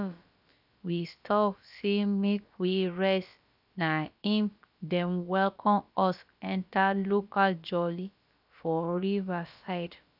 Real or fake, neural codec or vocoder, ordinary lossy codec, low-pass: fake; codec, 16 kHz, about 1 kbps, DyCAST, with the encoder's durations; none; 5.4 kHz